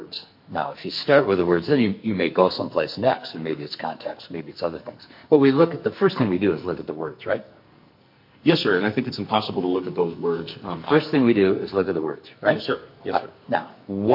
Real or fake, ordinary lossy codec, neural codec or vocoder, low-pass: fake; MP3, 32 kbps; codec, 44.1 kHz, 2.6 kbps, SNAC; 5.4 kHz